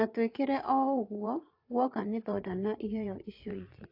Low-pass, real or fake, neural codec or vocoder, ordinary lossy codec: 5.4 kHz; fake; vocoder, 22.05 kHz, 80 mel bands, Vocos; AAC, 32 kbps